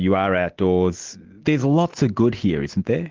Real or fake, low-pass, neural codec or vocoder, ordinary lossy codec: real; 7.2 kHz; none; Opus, 16 kbps